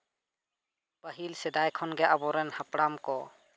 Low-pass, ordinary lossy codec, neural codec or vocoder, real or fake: none; none; none; real